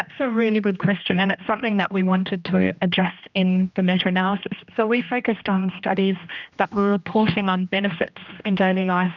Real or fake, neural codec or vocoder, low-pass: fake; codec, 16 kHz, 1 kbps, X-Codec, HuBERT features, trained on general audio; 7.2 kHz